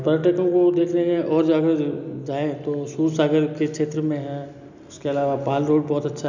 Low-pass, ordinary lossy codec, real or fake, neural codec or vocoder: 7.2 kHz; none; real; none